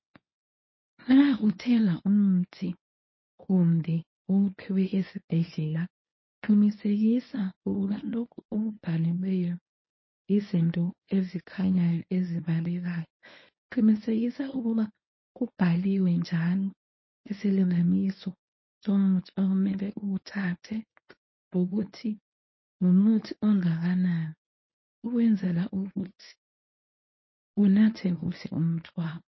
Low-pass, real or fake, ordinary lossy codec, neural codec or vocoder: 7.2 kHz; fake; MP3, 24 kbps; codec, 24 kHz, 0.9 kbps, WavTokenizer, medium speech release version 1